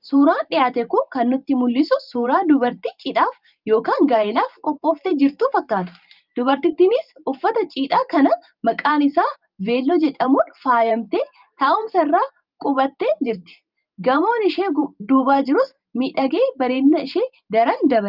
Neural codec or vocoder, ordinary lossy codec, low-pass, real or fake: vocoder, 44.1 kHz, 128 mel bands every 512 samples, BigVGAN v2; Opus, 24 kbps; 5.4 kHz; fake